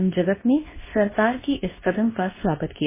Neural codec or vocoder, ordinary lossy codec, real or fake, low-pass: codec, 24 kHz, 0.9 kbps, WavTokenizer, medium speech release version 2; MP3, 16 kbps; fake; 3.6 kHz